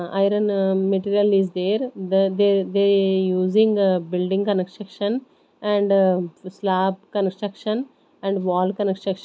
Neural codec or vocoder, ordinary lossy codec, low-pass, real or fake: none; none; none; real